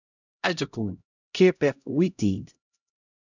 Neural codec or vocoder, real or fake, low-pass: codec, 16 kHz, 0.5 kbps, X-Codec, HuBERT features, trained on LibriSpeech; fake; 7.2 kHz